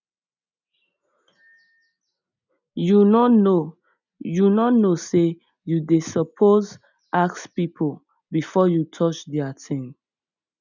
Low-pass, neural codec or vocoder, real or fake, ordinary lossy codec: 7.2 kHz; none; real; none